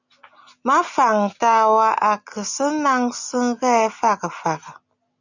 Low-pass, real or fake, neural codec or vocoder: 7.2 kHz; real; none